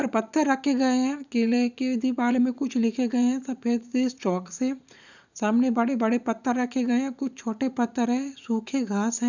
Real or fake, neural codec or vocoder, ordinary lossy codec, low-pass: fake; vocoder, 44.1 kHz, 80 mel bands, Vocos; none; 7.2 kHz